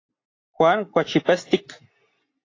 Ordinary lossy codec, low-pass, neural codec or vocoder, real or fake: AAC, 32 kbps; 7.2 kHz; none; real